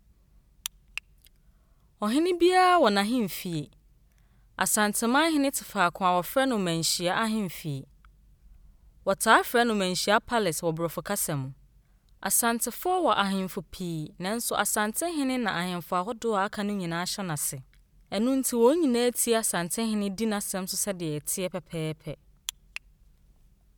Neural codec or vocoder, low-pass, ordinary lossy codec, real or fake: none; none; none; real